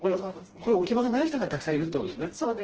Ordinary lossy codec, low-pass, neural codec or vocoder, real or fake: Opus, 16 kbps; 7.2 kHz; codec, 16 kHz, 1 kbps, FreqCodec, smaller model; fake